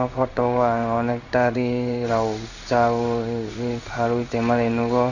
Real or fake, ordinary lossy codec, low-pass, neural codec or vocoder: fake; none; 7.2 kHz; codec, 16 kHz in and 24 kHz out, 1 kbps, XY-Tokenizer